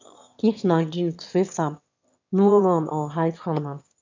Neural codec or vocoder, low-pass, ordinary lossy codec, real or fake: autoencoder, 22.05 kHz, a latent of 192 numbers a frame, VITS, trained on one speaker; 7.2 kHz; MP3, 64 kbps; fake